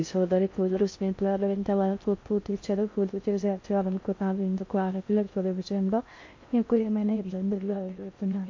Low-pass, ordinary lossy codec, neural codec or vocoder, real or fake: 7.2 kHz; MP3, 48 kbps; codec, 16 kHz in and 24 kHz out, 0.6 kbps, FocalCodec, streaming, 4096 codes; fake